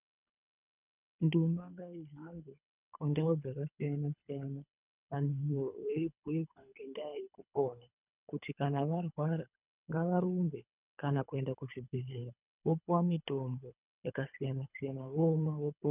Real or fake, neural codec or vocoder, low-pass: fake; codec, 24 kHz, 3 kbps, HILCodec; 3.6 kHz